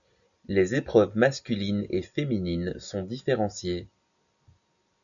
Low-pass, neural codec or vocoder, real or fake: 7.2 kHz; none; real